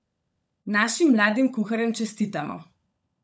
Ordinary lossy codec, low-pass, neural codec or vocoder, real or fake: none; none; codec, 16 kHz, 16 kbps, FunCodec, trained on LibriTTS, 50 frames a second; fake